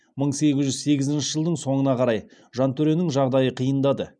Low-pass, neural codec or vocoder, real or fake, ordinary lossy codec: 9.9 kHz; none; real; none